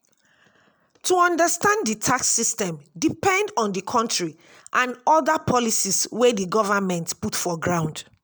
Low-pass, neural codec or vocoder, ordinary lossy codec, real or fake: none; none; none; real